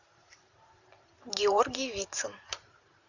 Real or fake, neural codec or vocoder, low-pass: fake; vocoder, 44.1 kHz, 128 mel bands every 512 samples, BigVGAN v2; 7.2 kHz